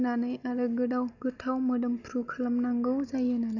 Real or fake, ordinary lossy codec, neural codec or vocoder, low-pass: real; none; none; 7.2 kHz